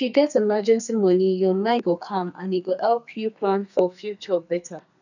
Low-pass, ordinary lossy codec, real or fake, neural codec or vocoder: 7.2 kHz; none; fake; codec, 32 kHz, 1.9 kbps, SNAC